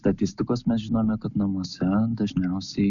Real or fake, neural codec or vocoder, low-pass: real; none; 7.2 kHz